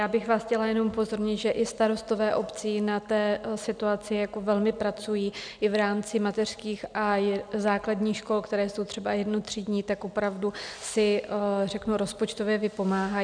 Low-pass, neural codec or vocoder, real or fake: 9.9 kHz; none; real